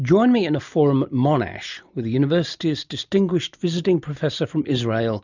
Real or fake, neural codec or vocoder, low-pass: real; none; 7.2 kHz